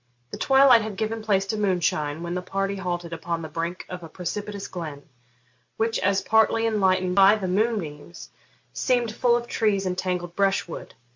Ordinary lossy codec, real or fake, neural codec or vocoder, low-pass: MP3, 48 kbps; real; none; 7.2 kHz